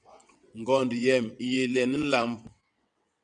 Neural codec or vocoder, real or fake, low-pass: vocoder, 22.05 kHz, 80 mel bands, WaveNeXt; fake; 9.9 kHz